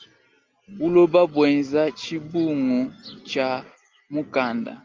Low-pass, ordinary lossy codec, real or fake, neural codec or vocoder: 7.2 kHz; Opus, 32 kbps; real; none